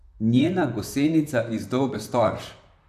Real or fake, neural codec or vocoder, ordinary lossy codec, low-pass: fake; vocoder, 44.1 kHz, 128 mel bands, Pupu-Vocoder; none; 14.4 kHz